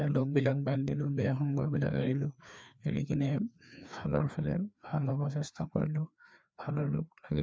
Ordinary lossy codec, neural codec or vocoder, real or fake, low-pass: none; codec, 16 kHz, 2 kbps, FreqCodec, larger model; fake; none